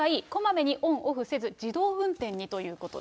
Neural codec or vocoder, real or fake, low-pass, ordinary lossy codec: none; real; none; none